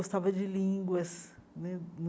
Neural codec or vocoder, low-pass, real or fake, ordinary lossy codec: none; none; real; none